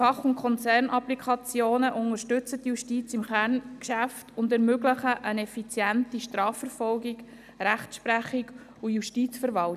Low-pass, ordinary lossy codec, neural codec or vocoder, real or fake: 14.4 kHz; none; none; real